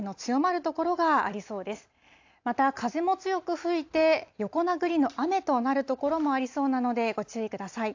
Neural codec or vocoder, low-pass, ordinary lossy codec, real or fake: none; 7.2 kHz; AAC, 48 kbps; real